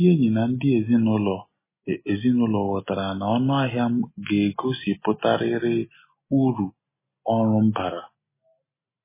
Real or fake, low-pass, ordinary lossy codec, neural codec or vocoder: real; 3.6 kHz; MP3, 16 kbps; none